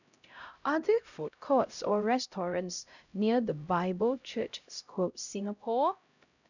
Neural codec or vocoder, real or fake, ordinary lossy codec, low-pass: codec, 16 kHz, 0.5 kbps, X-Codec, HuBERT features, trained on LibriSpeech; fake; none; 7.2 kHz